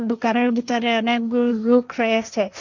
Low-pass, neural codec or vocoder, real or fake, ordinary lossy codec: 7.2 kHz; codec, 16 kHz, 1.1 kbps, Voila-Tokenizer; fake; none